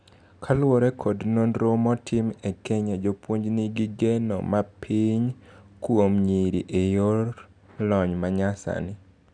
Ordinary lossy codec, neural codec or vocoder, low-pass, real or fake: none; none; 9.9 kHz; real